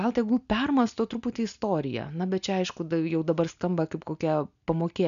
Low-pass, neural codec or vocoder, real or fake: 7.2 kHz; none; real